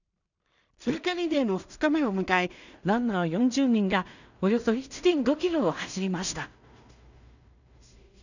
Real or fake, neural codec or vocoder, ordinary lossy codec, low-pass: fake; codec, 16 kHz in and 24 kHz out, 0.4 kbps, LongCat-Audio-Codec, two codebook decoder; none; 7.2 kHz